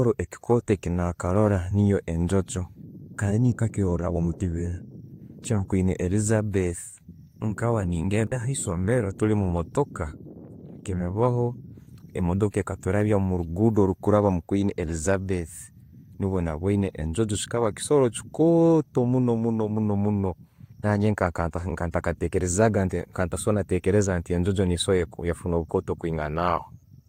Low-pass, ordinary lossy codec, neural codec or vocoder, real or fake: 19.8 kHz; AAC, 48 kbps; none; real